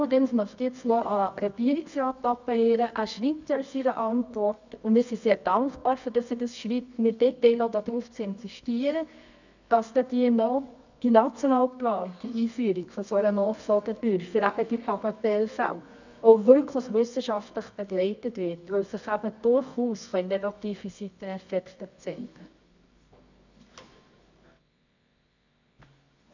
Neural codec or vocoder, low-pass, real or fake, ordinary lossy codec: codec, 24 kHz, 0.9 kbps, WavTokenizer, medium music audio release; 7.2 kHz; fake; none